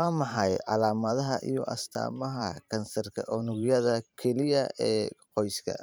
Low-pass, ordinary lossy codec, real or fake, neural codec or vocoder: none; none; fake; vocoder, 44.1 kHz, 128 mel bands every 512 samples, BigVGAN v2